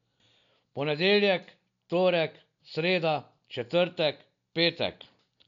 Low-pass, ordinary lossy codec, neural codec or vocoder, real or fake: 7.2 kHz; none; none; real